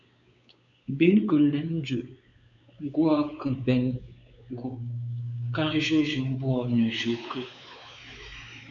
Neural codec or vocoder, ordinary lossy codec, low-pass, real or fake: codec, 16 kHz, 4 kbps, X-Codec, WavLM features, trained on Multilingual LibriSpeech; MP3, 96 kbps; 7.2 kHz; fake